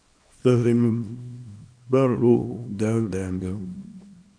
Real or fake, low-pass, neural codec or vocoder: fake; 9.9 kHz; codec, 24 kHz, 0.9 kbps, WavTokenizer, small release